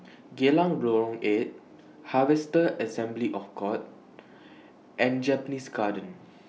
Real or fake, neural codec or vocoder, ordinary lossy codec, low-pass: real; none; none; none